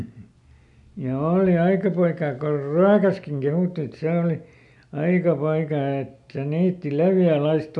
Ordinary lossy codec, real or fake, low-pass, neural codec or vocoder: none; real; 10.8 kHz; none